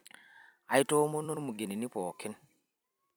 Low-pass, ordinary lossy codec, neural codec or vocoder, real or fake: none; none; vocoder, 44.1 kHz, 128 mel bands every 256 samples, BigVGAN v2; fake